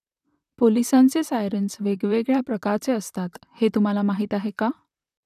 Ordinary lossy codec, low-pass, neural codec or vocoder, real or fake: none; 14.4 kHz; vocoder, 44.1 kHz, 128 mel bands, Pupu-Vocoder; fake